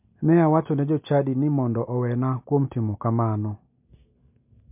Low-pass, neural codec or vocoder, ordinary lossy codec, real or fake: 3.6 kHz; none; MP3, 32 kbps; real